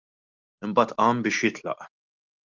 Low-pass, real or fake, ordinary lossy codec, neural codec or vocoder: 7.2 kHz; real; Opus, 32 kbps; none